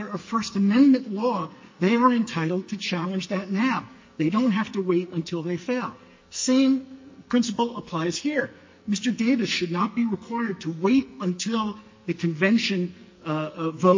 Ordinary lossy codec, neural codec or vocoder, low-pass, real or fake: MP3, 32 kbps; codec, 44.1 kHz, 2.6 kbps, SNAC; 7.2 kHz; fake